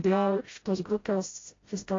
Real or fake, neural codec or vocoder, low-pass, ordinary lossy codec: fake; codec, 16 kHz, 0.5 kbps, FreqCodec, smaller model; 7.2 kHz; AAC, 32 kbps